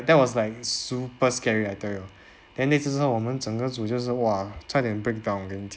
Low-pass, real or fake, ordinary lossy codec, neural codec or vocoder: none; real; none; none